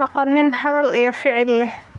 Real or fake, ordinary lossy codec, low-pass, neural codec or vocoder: fake; none; 10.8 kHz; codec, 24 kHz, 1 kbps, SNAC